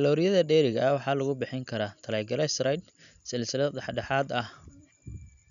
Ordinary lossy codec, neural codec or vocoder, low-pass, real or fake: none; none; 7.2 kHz; real